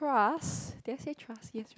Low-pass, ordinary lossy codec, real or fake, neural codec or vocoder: none; none; real; none